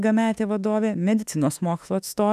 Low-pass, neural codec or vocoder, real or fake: 14.4 kHz; autoencoder, 48 kHz, 32 numbers a frame, DAC-VAE, trained on Japanese speech; fake